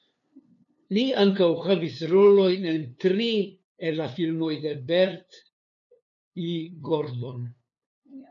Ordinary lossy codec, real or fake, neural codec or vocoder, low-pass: MP3, 64 kbps; fake; codec, 16 kHz, 2 kbps, FunCodec, trained on LibriTTS, 25 frames a second; 7.2 kHz